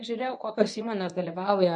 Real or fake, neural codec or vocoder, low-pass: fake; codec, 24 kHz, 0.9 kbps, WavTokenizer, medium speech release version 1; 10.8 kHz